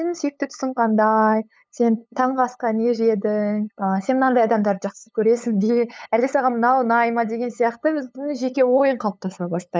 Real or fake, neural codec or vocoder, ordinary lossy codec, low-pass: fake; codec, 16 kHz, 8 kbps, FunCodec, trained on LibriTTS, 25 frames a second; none; none